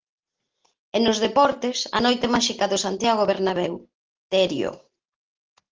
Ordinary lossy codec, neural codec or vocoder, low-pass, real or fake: Opus, 16 kbps; none; 7.2 kHz; real